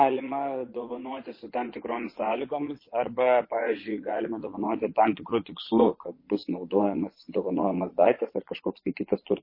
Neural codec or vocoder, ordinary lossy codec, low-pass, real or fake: vocoder, 22.05 kHz, 80 mel bands, Vocos; MP3, 24 kbps; 5.4 kHz; fake